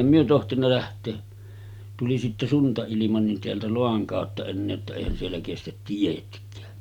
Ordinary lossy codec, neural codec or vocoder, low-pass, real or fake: none; none; 19.8 kHz; real